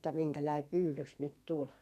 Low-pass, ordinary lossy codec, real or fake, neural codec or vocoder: 14.4 kHz; none; fake; codec, 32 kHz, 1.9 kbps, SNAC